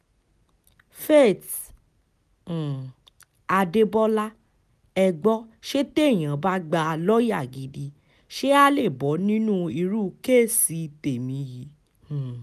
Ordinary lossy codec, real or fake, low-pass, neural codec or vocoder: none; real; 14.4 kHz; none